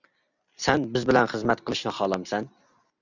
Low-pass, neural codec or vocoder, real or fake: 7.2 kHz; none; real